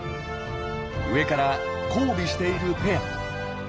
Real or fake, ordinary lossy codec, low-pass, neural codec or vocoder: real; none; none; none